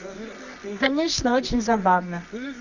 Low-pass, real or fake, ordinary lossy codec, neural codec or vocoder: 7.2 kHz; fake; none; codec, 24 kHz, 0.9 kbps, WavTokenizer, medium music audio release